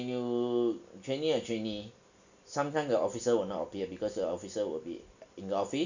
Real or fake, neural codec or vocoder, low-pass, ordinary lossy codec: real; none; 7.2 kHz; none